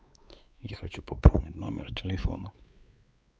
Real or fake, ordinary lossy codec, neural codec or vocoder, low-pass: fake; none; codec, 16 kHz, 4 kbps, X-Codec, WavLM features, trained on Multilingual LibriSpeech; none